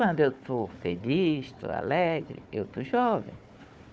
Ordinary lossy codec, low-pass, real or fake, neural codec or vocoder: none; none; fake; codec, 16 kHz, 4 kbps, FunCodec, trained on Chinese and English, 50 frames a second